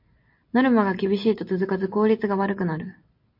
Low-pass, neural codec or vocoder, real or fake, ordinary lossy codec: 5.4 kHz; none; real; MP3, 32 kbps